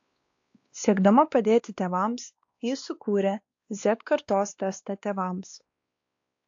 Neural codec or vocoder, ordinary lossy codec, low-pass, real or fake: codec, 16 kHz, 2 kbps, X-Codec, WavLM features, trained on Multilingual LibriSpeech; AAC, 48 kbps; 7.2 kHz; fake